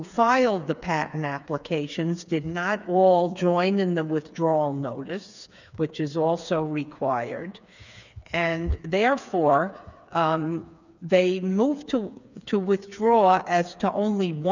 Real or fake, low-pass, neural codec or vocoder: fake; 7.2 kHz; codec, 16 kHz, 4 kbps, FreqCodec, smaller model